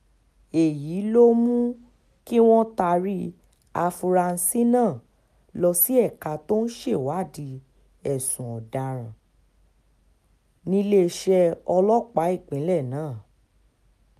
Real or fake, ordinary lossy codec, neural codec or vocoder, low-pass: real; none; none; 14.4 kHz